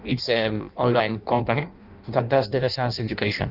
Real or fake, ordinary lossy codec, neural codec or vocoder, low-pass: fake; Opus, 24 kbps; codec, 16 kHz in and 24 kHz out, 0.6 kbps, FireRedTTS-2 codec; 5.4 kHz